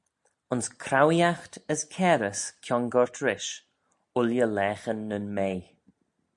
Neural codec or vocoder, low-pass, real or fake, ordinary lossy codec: none; 10.8 kHz; real; MP3, 64 kbps